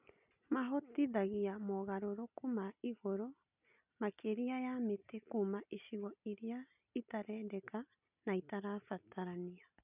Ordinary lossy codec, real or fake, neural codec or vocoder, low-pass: none; real; none; 3.6 kHz